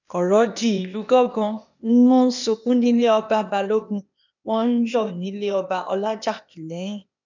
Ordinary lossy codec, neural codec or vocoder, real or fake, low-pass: none; codec, 16 kHz, 0.8 kbps, ZipCodec; fake; 7.2 kHz